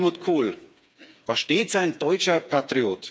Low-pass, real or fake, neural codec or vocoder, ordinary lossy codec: none; fake; codec, 16 kHz, 4 kbps, FreqCodec, smaller model; none